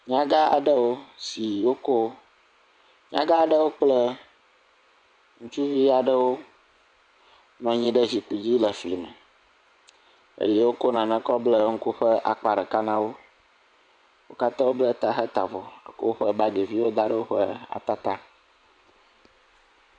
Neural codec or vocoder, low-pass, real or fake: vocoder, 24 kHz, 100 mel bands, Vocos; 9.9 kHz; fake